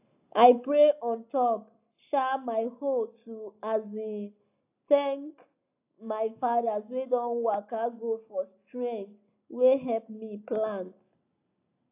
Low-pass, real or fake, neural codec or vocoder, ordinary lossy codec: 3.6 kHz; real; none; AAC, 32 kbps